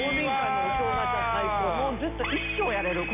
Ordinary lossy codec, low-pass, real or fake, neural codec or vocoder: none; 3.6 kHz; real; none